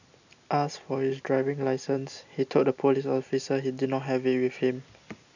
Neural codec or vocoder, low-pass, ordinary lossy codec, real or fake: none; 7.2 kHz; none; real